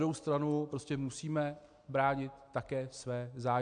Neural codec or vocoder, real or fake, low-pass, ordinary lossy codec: none; real; 9.9 kHz; AAC, 64 kbps